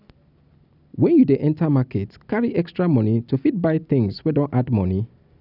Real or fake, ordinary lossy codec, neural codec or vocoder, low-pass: real; none; none; 5.4 kHz